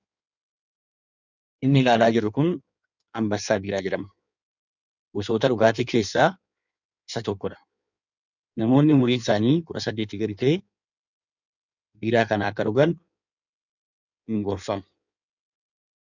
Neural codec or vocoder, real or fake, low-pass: codec, 16 kHz in and 24 kHz out, 1.1 kbps, FireRedTTS-2 codec; fake; 7.2 kHz